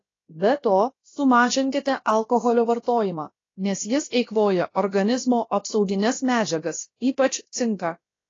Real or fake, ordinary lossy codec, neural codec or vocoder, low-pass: fake; AAC, 32 kbps; codec, 16 kHz, about 1 kbps, DyCAST, with the encoder's durations; 7.2 kHz